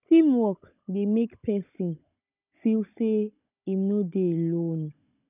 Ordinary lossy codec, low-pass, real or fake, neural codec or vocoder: none; 3.6 kHz; fake; codec, 16 kHz, 16 kbps, FunCodec, trained on Chinese and English, 50 frames a second